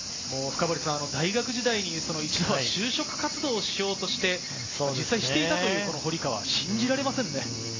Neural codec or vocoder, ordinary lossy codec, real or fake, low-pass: none; AAC, 32 kbps; real; 7.2 kHz